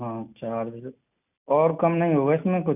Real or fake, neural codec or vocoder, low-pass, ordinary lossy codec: real; none; 3.6 kHz; none